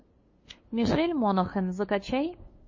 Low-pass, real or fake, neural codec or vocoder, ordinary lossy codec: 7.2 kHz; fake; codec, 16 kHz, 2 kbps, FunCodec, trained on LibriTTS, 25 frames a second; MP3, 32 kbps